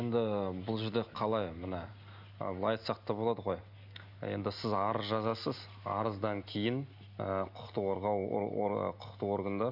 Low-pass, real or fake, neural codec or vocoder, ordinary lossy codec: 5.4 kHz; real; none; none